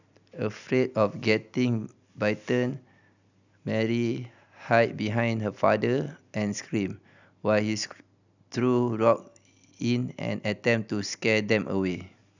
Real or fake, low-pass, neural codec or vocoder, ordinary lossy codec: real; 7.2 kHz; none; none